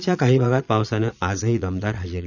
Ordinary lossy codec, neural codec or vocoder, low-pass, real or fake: none; vocoder, 22.05 kHz, 80 mel bands, Vocos; 7.2 kHz; fake